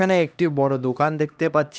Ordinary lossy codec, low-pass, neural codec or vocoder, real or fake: none; none; codec, 16 kHz, 1 kbps, X-Codec, HuBERT features, trained on LibriSpeech; fake